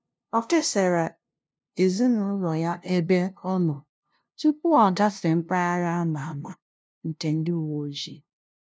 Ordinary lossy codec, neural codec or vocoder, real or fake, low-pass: none; codec, 16 kHz, 0.5 kbps, FunCodec, trained on LibriTTS, 25 frames a second; fake; none